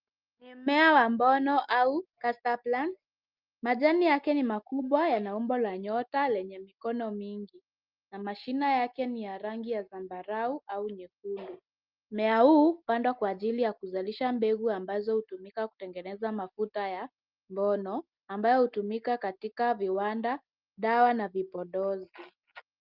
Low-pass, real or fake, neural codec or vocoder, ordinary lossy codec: 5.4 kHz; real; none; Opus, 24 kbps